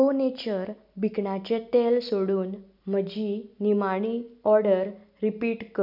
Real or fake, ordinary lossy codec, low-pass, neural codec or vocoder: real; none; 5.4 kHz; none